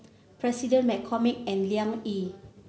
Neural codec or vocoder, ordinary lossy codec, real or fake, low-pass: none; none; real; none